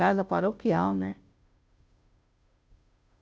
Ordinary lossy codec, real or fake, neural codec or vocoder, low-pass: none; fake; codec, 16 kHz, 0.5 kbps, FunCodec, trained on Chinese and English, 25 frames a second; none